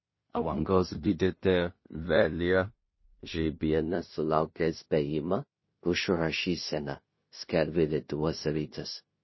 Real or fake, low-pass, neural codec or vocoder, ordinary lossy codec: fake; 7.2 kHz; codec, 16 kHz in and 24 kHz out, 0.4 kbps, LongCat-Audio-Codec, two codebook decoder; MP3, 24 kbps